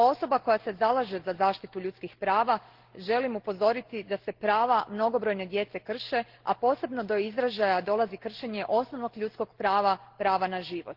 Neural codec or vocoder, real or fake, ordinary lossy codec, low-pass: none; real; Opus, 16 kbps; 5.4 kHz